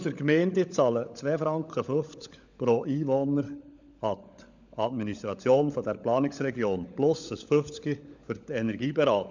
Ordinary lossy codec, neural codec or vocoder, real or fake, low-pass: none; codec, 16 kHz, 16 kbps, FunCodec, trained on LibriTTS, 50 frames a second; fake; 7.2 kHz